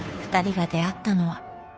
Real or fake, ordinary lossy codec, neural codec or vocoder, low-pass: fake; none; codec, 16 kHz, 2 kbps, FunCodec, trained on Chinese and English, 25 frames a second; none